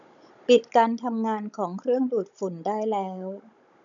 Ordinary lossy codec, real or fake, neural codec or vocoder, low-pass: none; fake; codec, 16 kHz, 16 kbps, FunCodec, trained on Chinese and English, 50 frames a second; 7.2 kHz